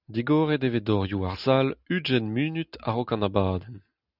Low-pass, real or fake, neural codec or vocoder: 5.4 kHz; real; none